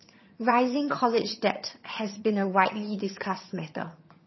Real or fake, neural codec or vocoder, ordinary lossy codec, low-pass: fake; vocoder, 22.05 kHz, 80 mel bands, HiFi-GAN; MP3, 24 kbps; 7.2 kHz